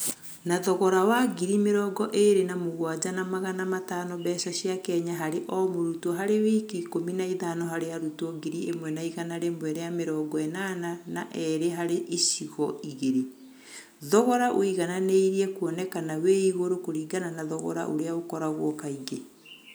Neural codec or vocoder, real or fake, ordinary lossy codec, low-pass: none; real; none; none